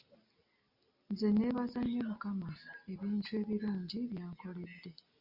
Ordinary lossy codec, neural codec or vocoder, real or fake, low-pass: Opus, 64 kbps; none; real; 5.4 kHz